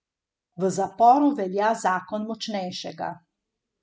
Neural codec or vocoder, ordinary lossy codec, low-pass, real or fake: none; none; none; real